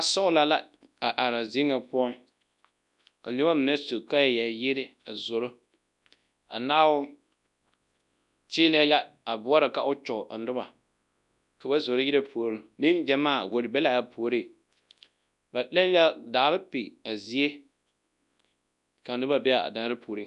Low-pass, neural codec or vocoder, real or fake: 10.8 kHz; codec, 24 kHz, 0.9 kbps, WavTokenizer, large speech release; fake